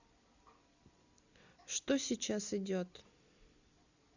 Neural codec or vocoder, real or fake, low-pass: none; real; 7.2 kHz